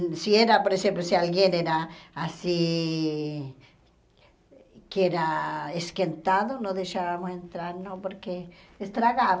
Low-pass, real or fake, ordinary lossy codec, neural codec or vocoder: none; real; none; none